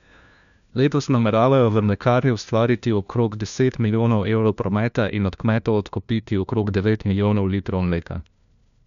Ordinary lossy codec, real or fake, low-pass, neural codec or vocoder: none; fake; 7.2 kHz; codec, 16 kHz, 1 kbps, FunCodec, trained on LibriTTS, 50 frames a second